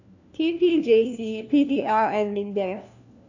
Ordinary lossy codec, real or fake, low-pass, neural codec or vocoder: none; fake; 7.2 kHz; codec, 16 kHz, 1 kbps, FunCodec, trained on LibriTTS, 50 frames a second